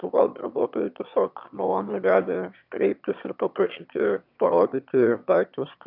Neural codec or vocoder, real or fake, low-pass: autoencoder, 22.05 kHz, a latent of 192 numbers a frame, VITS, trained on one speaker; fake; 5.4 kHz